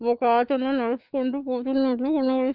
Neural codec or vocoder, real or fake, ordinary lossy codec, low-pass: autoencoder, 48 kHz, 128 numbers a frame, DAC-VAE, trained on Japanese speech; fake; Opus, 32 kbps; 5.4 kHz